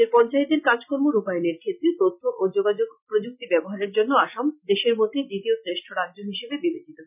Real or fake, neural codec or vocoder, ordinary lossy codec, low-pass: real; none; none; 3.6 kHz